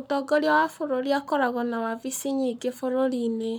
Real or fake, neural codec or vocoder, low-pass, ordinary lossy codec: fake; codec, 44.1 kHz, 7.8 kbps, Pupu-Codec; none; none